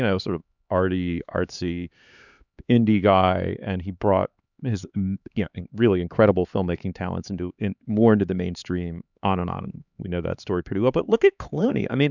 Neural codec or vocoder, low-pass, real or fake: codec, 16 kHz, 4 kbps, X-Codec, HuBERT features, trained on LibriSpeech; 7.2 kHz; fake